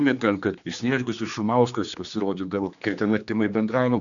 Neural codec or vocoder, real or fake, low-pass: codec, 16 kHz, 2 kbps, X-Codec, HuBERT features, trained on general audio; fake; 7.2 kHz